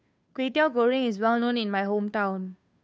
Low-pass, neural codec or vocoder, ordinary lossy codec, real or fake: none; codec, 16 kHz, 2 kbps, FunCodec, trained on Chinese and English, 25 frames a second; none; fake